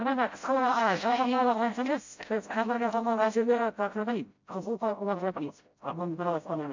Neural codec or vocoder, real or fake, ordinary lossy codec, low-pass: codec, 16 kHz, 0.5 kbps, FreqCodec, smaller model; fake; MP3, 48 kbps; 7.2 kHz